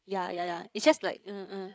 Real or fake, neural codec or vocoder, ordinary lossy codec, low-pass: fake; codec, 16 kHz, 8 kbps, FreqCodec, larger model; none; none